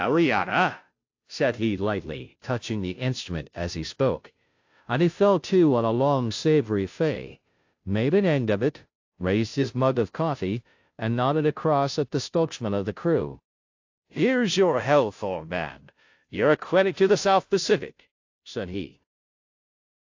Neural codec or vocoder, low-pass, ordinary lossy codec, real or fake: codec, 16 kHz, 0.5 kbps, FunCodec, trained on Chinese and English, 25 frames a second; 7.2 kHz; AAC, 48 kbps; fake